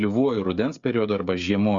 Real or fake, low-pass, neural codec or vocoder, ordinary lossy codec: fake; 7.2 kHz; codec, 16 kHz, 16 kbps, FreqCodec, smaller model; Opus, 64 kbps